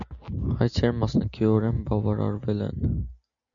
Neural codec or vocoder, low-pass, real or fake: none; 7.2 kHz; real